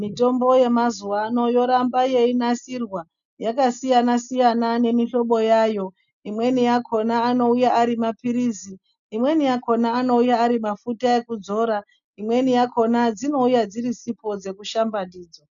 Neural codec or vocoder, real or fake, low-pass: none; real; 7.2 kHz